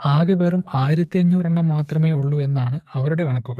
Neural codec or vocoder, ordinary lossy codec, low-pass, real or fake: codec, 44.1 kHz, 2.6 kbps, SNAC; none; 14.4 kHz; fake